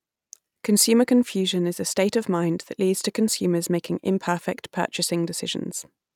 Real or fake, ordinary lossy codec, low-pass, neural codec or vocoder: real; none; 19.8 kHz; none